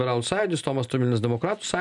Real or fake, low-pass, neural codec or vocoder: real; 10.8 kHz; none